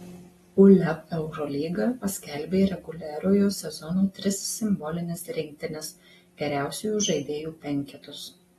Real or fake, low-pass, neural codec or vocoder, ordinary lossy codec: real; 19.8 kHz; none; AAC, 32 kbps